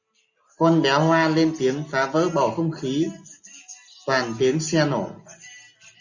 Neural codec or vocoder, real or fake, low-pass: none; real; 7.2 kHz